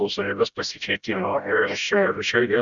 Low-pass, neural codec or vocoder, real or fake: 7.2 kHz; codec, 16 kHz, 0.5 kbps, FreqCodec, smaller model; fake